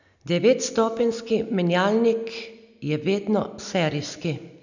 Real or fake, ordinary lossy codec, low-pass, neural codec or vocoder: real; none; 7.2 kHz; none